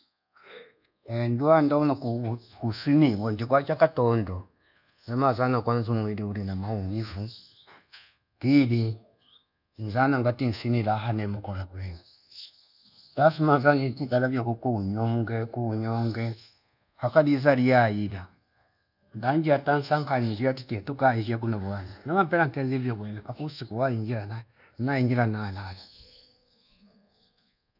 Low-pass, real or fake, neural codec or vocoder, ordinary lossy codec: 5.4 kHz; fake; codec, 24 kHz, 1.2 kbps, DualCodec; none